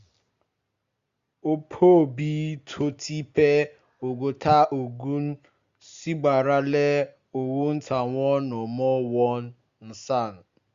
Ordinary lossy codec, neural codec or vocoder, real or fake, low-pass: Opus, 64 kbps; none; real; 7.2 kHz